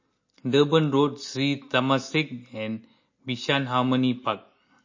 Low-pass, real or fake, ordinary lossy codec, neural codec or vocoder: 7.2 kHz; real; MP3, 32 kbps; none